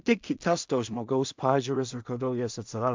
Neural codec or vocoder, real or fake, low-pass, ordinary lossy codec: codec, 16 kHz in and 24 kHz out, 0.4 kbps, LongCat-Audio-Codec, two codebook decoder; fake; 7.2 kHz; MP3, 64 kbps